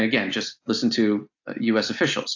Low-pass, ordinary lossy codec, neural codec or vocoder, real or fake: 7.2 kHz; AAC, 48 kbps; none; real